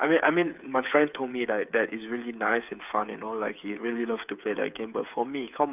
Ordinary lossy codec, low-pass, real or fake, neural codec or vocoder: none; 3.6 kHz; fake; codec, 16 kHz, 8 kbps, FreqCodec, smaller model